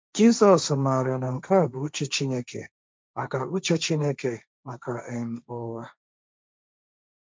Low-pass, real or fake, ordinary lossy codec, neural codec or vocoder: none; fake; none; codec, 16 kHz, 1.1 kbps, Voila-Tokenizer